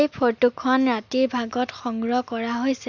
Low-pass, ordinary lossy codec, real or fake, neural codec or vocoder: 7.2 kHz; none; real; none